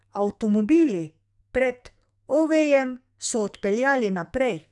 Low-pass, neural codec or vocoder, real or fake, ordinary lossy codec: 10.8 kHz; codec, 44.1 kHz, 2.6 kbps, SNAC; fake; none